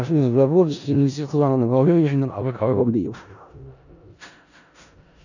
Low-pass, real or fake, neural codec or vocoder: 7.2 kHz; fake; codec, 16 kHz in and 24 kHz out, 0.4 kbps, LongCat-Audio-Codec, four codebook decoder